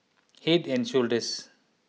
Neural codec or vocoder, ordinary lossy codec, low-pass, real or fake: none; none; none; real